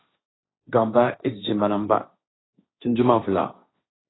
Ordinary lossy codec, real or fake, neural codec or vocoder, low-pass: AAC, 16 kbps; fake; codec, 16 kHz, 1.1 kbps, Voila-Tokenizer; 7.2 kHz